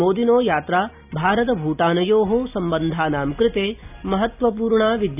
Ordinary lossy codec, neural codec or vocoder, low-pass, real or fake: none; none; 3.6 kHz; real